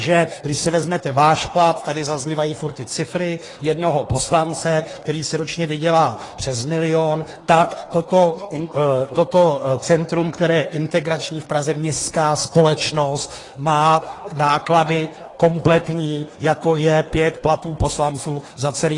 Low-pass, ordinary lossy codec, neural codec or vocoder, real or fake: 10.8 kHz; AAC, 32 kbps; codec, 24 kHz, 1 kbps, SNAC; fake